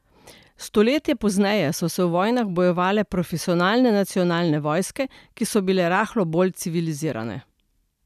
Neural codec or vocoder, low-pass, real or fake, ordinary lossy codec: none; 14.4 kHz; real; none